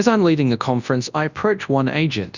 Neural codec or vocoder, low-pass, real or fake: codec, 24 kHz, 0.9 kbps, WavTokenizer, large speech release; 7.2 kHz; fake